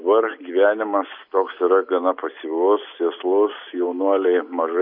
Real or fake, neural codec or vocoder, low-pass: real; none; 5.4 kHz